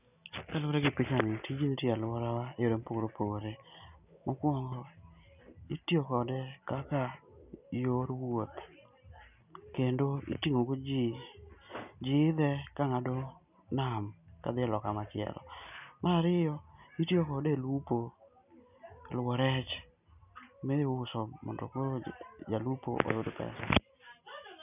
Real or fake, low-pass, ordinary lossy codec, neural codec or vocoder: real; 3.6 kHz; none; none